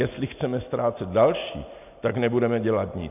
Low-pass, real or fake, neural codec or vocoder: 3.6 kHz; real; none